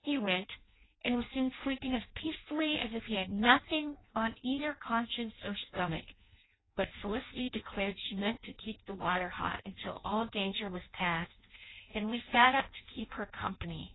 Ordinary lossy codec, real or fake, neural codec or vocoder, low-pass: AAC, 16 kbps; fake; codec, 16 kHz in and 24 kHz out, 0.6 kbps, FireRedTTS-2 codec; 7.2 kHz